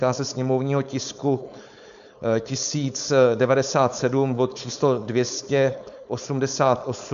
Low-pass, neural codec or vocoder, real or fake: 7.2 kHz; codec, 16 kHz, 4.8 kbps, FACodec; fake